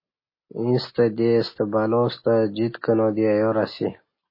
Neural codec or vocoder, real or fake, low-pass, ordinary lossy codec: none; real; 5.4 kHz; MP3, 24 kbps